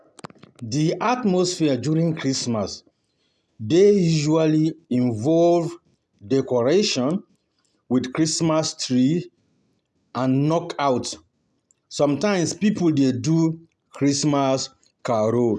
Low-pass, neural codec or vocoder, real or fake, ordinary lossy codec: none; none; real; none